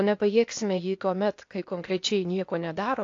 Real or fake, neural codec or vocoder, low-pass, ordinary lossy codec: fake; codec, 16 kHz, 0.8 kbps, ZipCodec; 7.2 kHz; AAC, 48 kbps